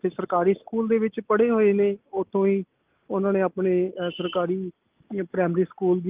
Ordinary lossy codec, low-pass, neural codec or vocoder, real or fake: Opus, 32 kbps; 3.6 kHz; none; real